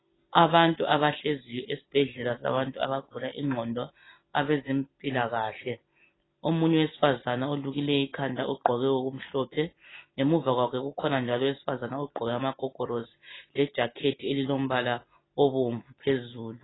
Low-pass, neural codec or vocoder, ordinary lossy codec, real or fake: 7.2 kHz; none; AAC, 16 kbps; real